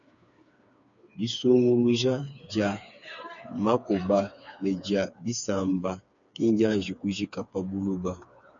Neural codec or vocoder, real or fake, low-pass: codec, 16 kHz, 4 kbps, FreqCodec, smaller model; fake; 7.2 kHz